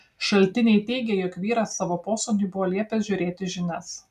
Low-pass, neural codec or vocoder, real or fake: 14.4 kHz; none; real